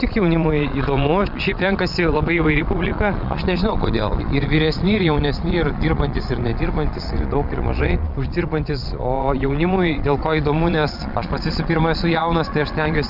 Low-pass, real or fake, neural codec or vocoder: 5.4 kHz; fake; vocoder, 22.05 kHz, 80 mel bands, Vocos